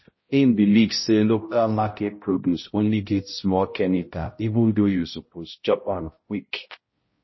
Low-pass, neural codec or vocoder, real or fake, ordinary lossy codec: 7.2 kHz; codec, 16 kHz, 0.5 kbps, X-Codec, HuBERT features, trained on balanced general audio; fake; MP3, 24 kbps